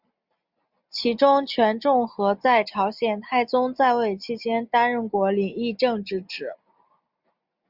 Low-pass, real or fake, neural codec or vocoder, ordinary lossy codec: 5.4 kHz; real; none; Opus, 64 kbps